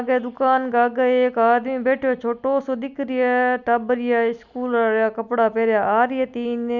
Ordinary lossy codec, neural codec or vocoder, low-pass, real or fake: none; none; 7.2 kHz; real